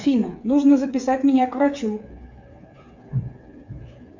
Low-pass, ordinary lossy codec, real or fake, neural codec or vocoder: 7.2 kHz; MP3, 64 kbps; fake; codec, 16 kHz, 8 kbps, FreqCodec, smaller model